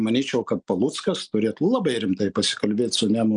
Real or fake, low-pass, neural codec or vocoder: real; 9.9 kHz; none